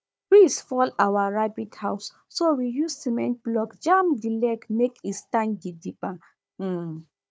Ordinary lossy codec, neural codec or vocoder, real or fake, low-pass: none; codec, 16 kHz, 4 kbps, FunCodec, trained on Chinese and English, 50 frames a second; fake; none